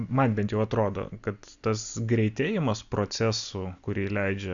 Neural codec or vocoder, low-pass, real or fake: none; 7.2 kHz; real